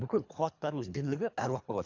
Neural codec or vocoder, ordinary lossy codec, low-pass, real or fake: codec, 24 kHz, 3 kbps, HILCodec; AAC, 48 kbps; 7.2 kHz; fake